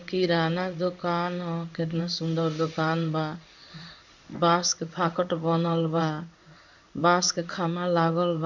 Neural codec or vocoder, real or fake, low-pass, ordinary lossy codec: codec, 16 kHz in and 24 kHz out, 1 kbps, XY-Tokenizer; fake; 7.2 kHz; none